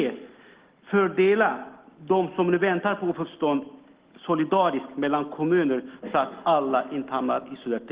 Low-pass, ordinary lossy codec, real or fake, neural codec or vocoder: 3.6 kHz; Opus, 16 kbps; real; none